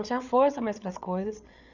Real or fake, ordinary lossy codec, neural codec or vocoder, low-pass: fake; none; codec, 16 kHz, 8 kbps, FreqCodec, larger model; 7.2 kHz